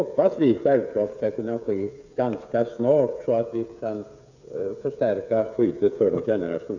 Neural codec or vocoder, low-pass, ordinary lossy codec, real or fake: codec, 16 kHz, 8 kbps, FreqCodec, smaller model; 7.2 kHz; none; fake